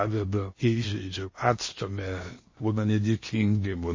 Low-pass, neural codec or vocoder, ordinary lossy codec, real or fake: 7.2 kHz; codec, 16 kHz in and 24 kHz out, 0.8 kbps, FocalCodec, streaming, 65536 codes; MP3, 32 kbps; fake